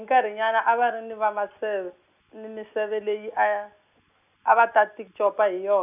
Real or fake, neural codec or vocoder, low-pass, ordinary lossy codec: real; none; 3.6 kHz; none